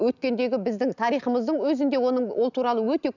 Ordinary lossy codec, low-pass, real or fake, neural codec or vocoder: none; 7.2 kHz; real; none